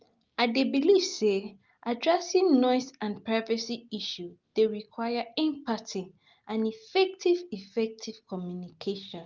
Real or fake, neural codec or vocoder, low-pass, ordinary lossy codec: real; none; 7.2 kHz; Opus, 24 kbps